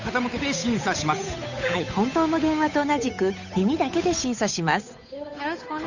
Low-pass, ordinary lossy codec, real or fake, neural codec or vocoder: 7.2 kHz; none; fake; codec, 16 kHz, 8 kbps, FunCodec, trained on Chinese and English, 25 frames a second